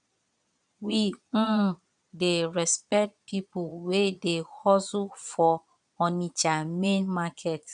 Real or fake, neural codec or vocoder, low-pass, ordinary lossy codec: fake; vocoder, 22.05 kHz, 80 mel bands, Vocos; 9.9 kHz; none